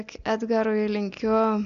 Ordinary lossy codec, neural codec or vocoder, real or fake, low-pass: AAC, 64 kbps; none; real; 7.2 kHz